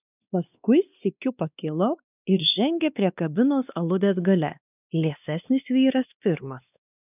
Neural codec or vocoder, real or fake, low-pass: codec, 16 kHz, 2 kbps, X-Codec, WavLM features, trained on Multilingual LibriSpeech; fake; 3.6 kHz